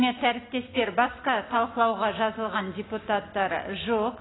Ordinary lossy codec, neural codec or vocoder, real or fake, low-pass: AAC, 16 kbps; none; real; 7.2 kHz